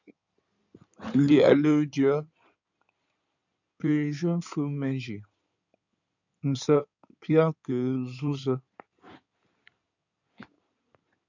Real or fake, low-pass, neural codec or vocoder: fake; 7.2 kHz; codec, 16 kHz in and 24 kHz out, 2.2 kbps, FireRedTTS-2 codec